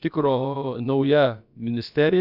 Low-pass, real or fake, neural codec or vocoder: 5.4 kHz; fake; codec, 16 kHz, about 1 kbps, DyCAST, with the encoder's durations